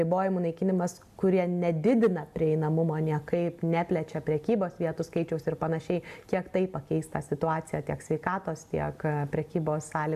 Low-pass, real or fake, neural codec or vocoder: 14.4 kHz; real; none